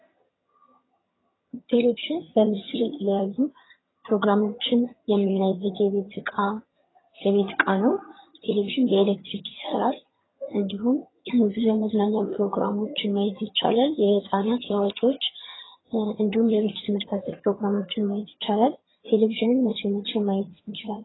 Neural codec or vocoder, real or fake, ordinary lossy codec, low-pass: vocoder, 22.05 kHz, 80 mel bands, HiFi-GAN; fake; AAC, 16 kbps; 7.2 kHz